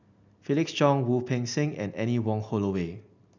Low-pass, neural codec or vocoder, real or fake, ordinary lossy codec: 7.2 kHz; none; real; none